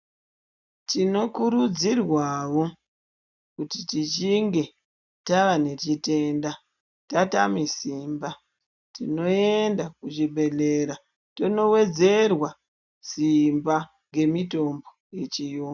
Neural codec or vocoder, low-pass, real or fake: none; 7.2 kHz; real